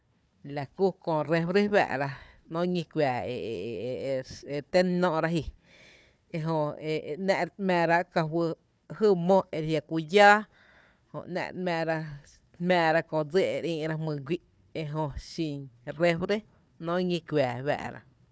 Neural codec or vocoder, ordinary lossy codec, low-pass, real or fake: codec, 16 kHz, 4 kbps, FunCodec, trained on Chinese and English, 50 frames a second; none; none; fake